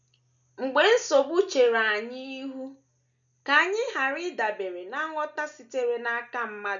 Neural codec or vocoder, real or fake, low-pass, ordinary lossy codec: none; real; 7.2 kHz; none